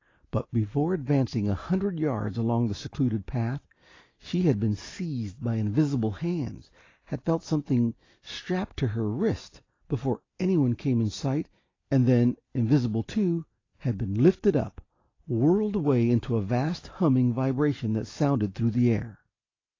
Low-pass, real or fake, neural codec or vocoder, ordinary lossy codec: 7.2 kHz; real; none; AAC, 32 kbps